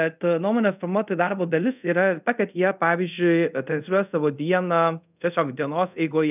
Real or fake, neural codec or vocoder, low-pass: fake; codec, 24 kHz, 0.5 kbps, DualCodec; 3.6 kHz